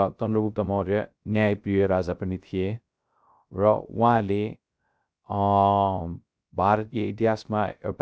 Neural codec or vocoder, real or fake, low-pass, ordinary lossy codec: codec, 16 kHz, 0.3 kbps, FocalCodec; fake; none; none